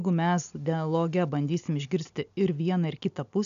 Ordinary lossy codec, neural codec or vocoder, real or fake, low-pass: MP3, 96 kbps; none; real; 7.2 kHz